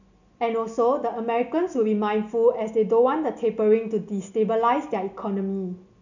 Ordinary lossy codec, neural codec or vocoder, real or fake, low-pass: none; none; real; 7.2 kHz